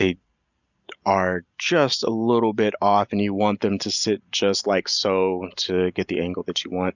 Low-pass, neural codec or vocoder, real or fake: 7.2 kHz; none; real